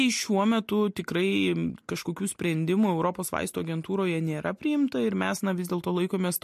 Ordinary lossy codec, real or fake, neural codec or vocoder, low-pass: MP3, 64 kbps; real; none; 14.4 kHz